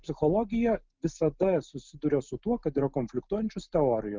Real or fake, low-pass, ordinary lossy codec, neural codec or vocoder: real; 7.2 kHz; Opus, 24 kbps; none